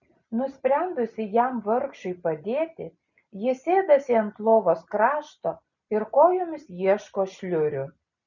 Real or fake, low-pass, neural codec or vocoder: real; 7.2 kHz; none